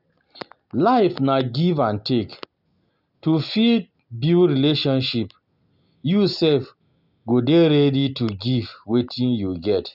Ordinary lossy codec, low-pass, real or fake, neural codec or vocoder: none; 5.4 kHz; real; none